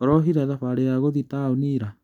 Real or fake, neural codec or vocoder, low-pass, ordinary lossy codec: real; none; 19.8 kHz; none